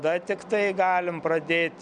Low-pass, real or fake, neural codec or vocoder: 9.9 kHz; real; none